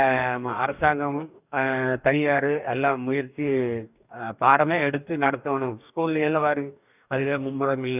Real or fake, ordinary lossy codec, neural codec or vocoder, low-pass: fake; none; codec, 44.1 kHz, 2.6 kbps, DAC; 3.6 kHz